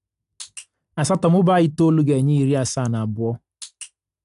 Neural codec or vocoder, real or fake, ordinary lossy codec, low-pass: none; real; AAC, 96 kbps; 10.8 kHz